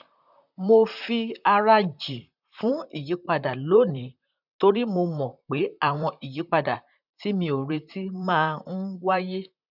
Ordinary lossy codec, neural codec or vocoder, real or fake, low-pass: none; vocoder, 44.1 kHz, 128 mel bands, Pupu-Vocoder; fake; 5.4 kHz